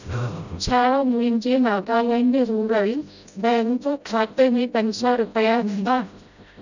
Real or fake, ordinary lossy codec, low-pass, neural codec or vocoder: fake; none; 7.2 kHz; codec, 16 kHz, 0.5 kbps, FreqCodec, smaller model